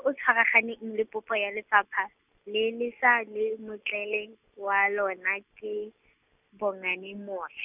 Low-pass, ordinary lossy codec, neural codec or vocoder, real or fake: 3.6 kHz; none; none; real